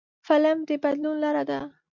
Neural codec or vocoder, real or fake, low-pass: none; real; 7.2 kHz